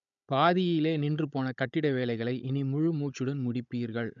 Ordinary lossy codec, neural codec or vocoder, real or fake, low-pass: none; codec, 16 kHz, 16 kbps, FunCodec, trained on Chinese and English, 50 frames a second; fake; 7.2 kHz